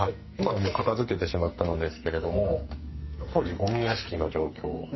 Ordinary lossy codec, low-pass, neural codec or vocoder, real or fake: MP3, 24 kbps; 7.2 kHz; codec, 44.1 kHz, 2.6 kbps, SNAC; fake